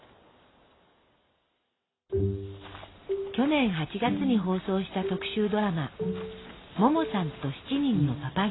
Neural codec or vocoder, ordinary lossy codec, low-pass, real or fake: none; AAC, 16 kbps; 7.2 kHz; real